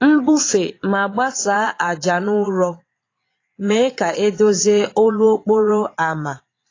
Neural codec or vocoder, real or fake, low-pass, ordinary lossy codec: vocoder, 22.05 kHz, 80 mel bands, Vocos; fake; 7.2 kHz; AAC, 32 kbps